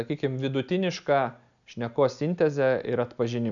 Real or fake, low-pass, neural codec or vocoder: real; 7.2 kHz; none